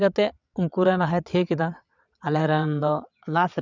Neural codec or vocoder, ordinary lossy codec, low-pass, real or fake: vocoder, 22.05 kHz, 80 mel bands, WaveNeXt; none; 7.2 kHz; fake